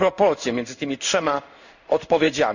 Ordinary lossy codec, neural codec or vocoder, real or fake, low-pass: none; codec, 16 kHz in and 24 kHz out, 1 kbps, XY-Tokenizer; fake; 7.2 kHz